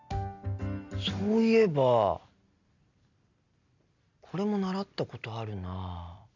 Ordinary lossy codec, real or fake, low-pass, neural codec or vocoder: none; real; 7.2 kHz; none